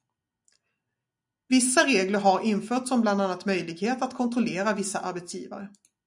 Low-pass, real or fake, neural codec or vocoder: 10.8 kHz; real; none